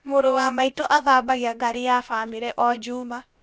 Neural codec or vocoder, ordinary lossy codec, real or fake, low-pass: codec, 16 kHz, about 1 kbps, DyCAST, with the encoder's durations; none; fake; none